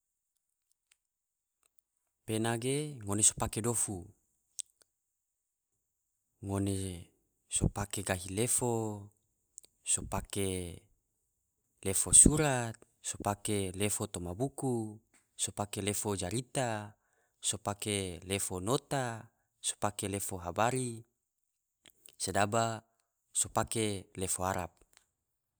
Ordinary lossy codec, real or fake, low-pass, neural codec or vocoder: none; real; none; none